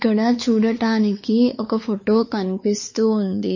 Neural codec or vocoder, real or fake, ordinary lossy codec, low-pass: codec, 16 kHz, 4 kbps, X-Codec, WavLM features, trained on Multilingual LibriSpeech; fake; MP3, 32 kbps; 7.2 kHz